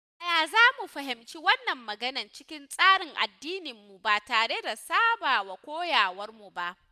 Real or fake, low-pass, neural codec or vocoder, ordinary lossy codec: real; 14.4 kHz; none; none